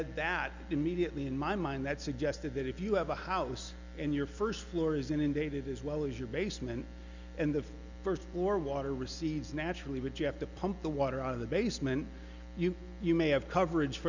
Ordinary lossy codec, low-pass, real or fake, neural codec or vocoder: Opus, 64 kbps; 7.2 kHz; real; none